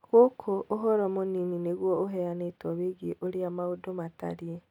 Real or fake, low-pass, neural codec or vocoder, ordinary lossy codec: real; 19.8 kHz; none; none